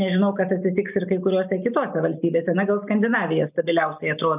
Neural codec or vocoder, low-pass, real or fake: none; 3.6 kHz; real